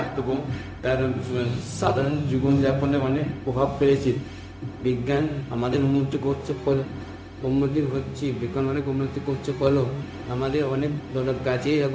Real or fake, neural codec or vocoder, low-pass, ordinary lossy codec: fake; codec, 16 kHz, 0.4 kbps, LongCat-Audio-Codec; none; none